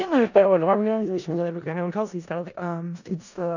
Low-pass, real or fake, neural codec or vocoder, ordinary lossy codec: 7.2 kHz; fake; codec, 16 kHz in and 24 kHz out, 0.4 kbps, LongCat-Audio-Codec, four codebook decoder; none